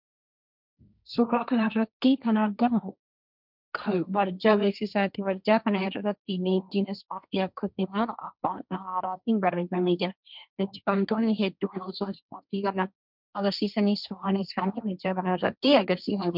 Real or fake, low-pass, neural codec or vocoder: fake; 5.4 kHz; codec, 16 kHz, 1.1 kbps, Voila-Tokenizer